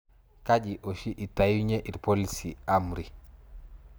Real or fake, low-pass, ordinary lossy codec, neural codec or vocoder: real; none; none; none